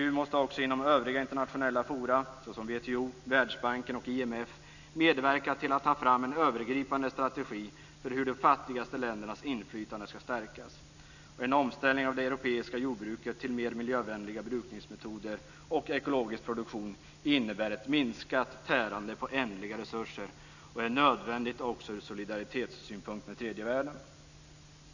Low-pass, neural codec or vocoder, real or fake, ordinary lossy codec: 7.2 kHz; none; real; none